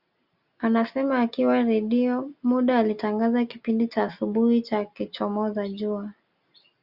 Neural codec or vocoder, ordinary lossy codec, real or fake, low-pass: none; Opus, 64 kbps; real; 5.4 kHz